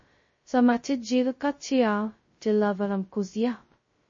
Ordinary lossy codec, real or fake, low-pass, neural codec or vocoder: MP3, 32 kbps; fake; 7.2 kHz; codec, 16 kHz, 0.2 kbps, FocalCodec